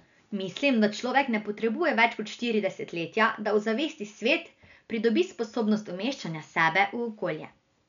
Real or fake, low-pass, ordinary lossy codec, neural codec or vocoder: real; 7.2 kHz; none; none